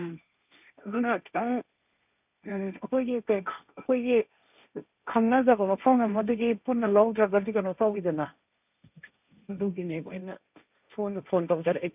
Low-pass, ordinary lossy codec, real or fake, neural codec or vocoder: 3.6 kHz; none; fake; codec, 16 kHz, 1.1 kbps, Voila-Tokenizer